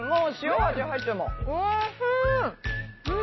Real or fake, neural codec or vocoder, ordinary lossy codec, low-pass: real; none; MP3, 24 kbps; 7.2 kHz